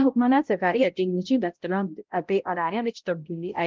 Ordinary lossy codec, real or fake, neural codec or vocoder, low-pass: Opus, 32 kbps; fake; codec, 16 kHz, 0.5 kbps, X-Codec, HuBERT features, trained on balanced general audio; 7.2 kHz